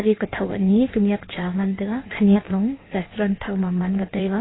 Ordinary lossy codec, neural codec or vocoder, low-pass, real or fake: AAC, 16 kbps; codec, 16 kHz in and 24 kHz out, 1.1 kbps, FireRedTTS-2 codec; 7.2 kHz; fake